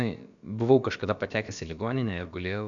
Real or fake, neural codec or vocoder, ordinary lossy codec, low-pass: fake; codec, 16 kHz, about 1 kbps, DyCAST, with the encoder's durations; MP3, 64 kbps; 7.2 kHz